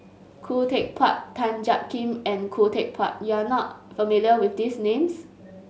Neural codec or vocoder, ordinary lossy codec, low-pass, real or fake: none; none; none; real